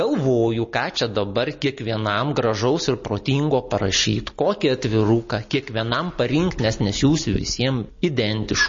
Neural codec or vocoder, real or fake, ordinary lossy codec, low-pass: none; real; MP3, 32 kbps; 7.2 kHz